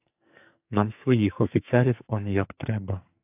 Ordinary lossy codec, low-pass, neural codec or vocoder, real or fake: AAC, 32 kbps; 3.6 kHz; codec, 44.1 kHz, 2.6 kbps, SNAC; fake